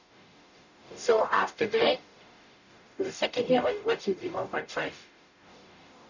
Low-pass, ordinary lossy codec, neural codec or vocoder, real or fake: 7.2 kHz; none; codec, 44.1 kHz, 0.9 kbps, DAC; fake